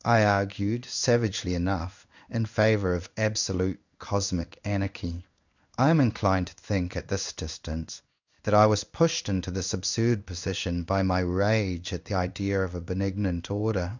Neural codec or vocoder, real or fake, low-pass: codec, 16 kHz in and 24 kHz out, 1 kbps, XY-Tokenizer; fake; 7.2 kHz